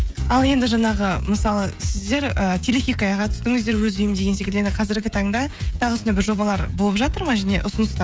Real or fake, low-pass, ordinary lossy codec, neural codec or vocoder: fake; none; none; codec, 16 kHz, 16 kbps, FreqCodec, smaller model